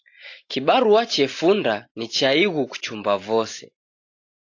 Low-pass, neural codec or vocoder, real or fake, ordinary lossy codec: 7.2 kHz; none; real; AAC, 48 kbps